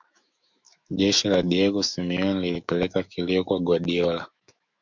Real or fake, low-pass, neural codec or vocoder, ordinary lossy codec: fake; 7.2 kHz; autoencoder, 48 kHz, 128 numbers a frame, DAC-VAE, trained on Japanese speech; MP3, 64 kbps